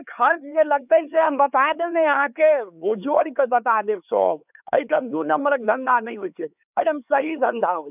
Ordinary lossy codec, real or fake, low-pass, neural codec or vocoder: none; fake; 3.6 kHz; codec, 16 kHz, 4 kbps, X-Codec, HuBERT features, trained on LibriSpeech